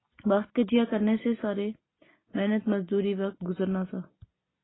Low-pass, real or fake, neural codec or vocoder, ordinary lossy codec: 7.2 kHz; real; none; AAC, 16 kbps